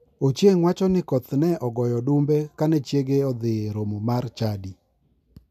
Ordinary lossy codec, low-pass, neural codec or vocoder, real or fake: none; 14.4 kHz; none; real